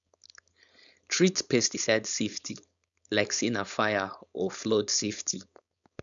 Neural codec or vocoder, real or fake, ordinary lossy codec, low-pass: codec, 16 kHz, 4.8 kbps, FACodec; fake; none; 7.2 kHz